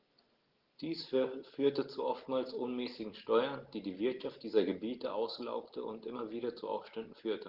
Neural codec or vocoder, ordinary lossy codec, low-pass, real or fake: none; Opus, 16 kbps; 5.4 kHz; real